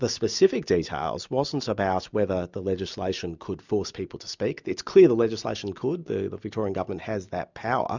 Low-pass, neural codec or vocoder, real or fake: 7.2 kHz; none; real